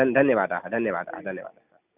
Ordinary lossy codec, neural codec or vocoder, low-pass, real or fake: none; none; 3.6 kHz; real